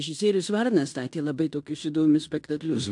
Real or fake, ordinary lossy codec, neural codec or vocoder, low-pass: fake; AAC, 64 kbps; codec, 16 kHz in and 24 kHz out, 0.9 kbps, LongCat-Audio-Codec, fine tuned four codebook decoder; 10.8 kHz